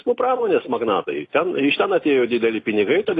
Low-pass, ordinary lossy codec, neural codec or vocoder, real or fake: 10.8 kHz; AAC, 32 kbps; none; real